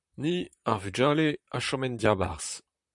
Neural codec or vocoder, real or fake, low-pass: vocoder, 44.1 kHz, 128 mel bands, Pupu-Vocoder; fake; 10.8 kHz